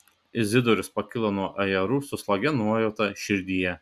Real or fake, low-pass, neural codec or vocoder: real; 14.4 kHz; none